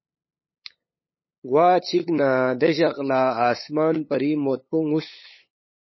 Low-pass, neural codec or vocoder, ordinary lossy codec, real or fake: 7.2 kHz; codec, 16 kHz, 8 kbps, FunCodec, trained on LibriTTS, 25 frames a second; MP3, 24 kbps; fake